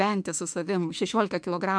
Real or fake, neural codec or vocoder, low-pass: fake; autoencoder, 48 kHz, 32 numbers a frame, DAC-VAE, trained on Japanese speech; 9.9 kHz